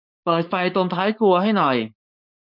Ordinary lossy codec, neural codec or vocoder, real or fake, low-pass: none; codec, 16 kHz, 4.8 kbps, FACodec; fake; 5.4 kHz